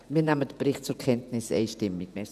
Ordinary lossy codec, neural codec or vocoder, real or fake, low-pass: none; vocoder, 48 kHz, 128 mel bands, Vocos; fake; 14.4 kHz